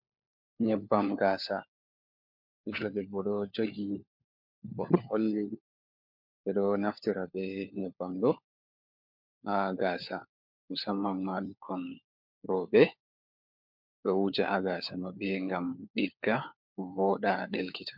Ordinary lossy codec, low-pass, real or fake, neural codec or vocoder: MP3, 48 kbps; 5.4 kHz; fake; codec, 16 kHz, 4 kbps, FunCodec, trained on LibriTTS, 50 frames a second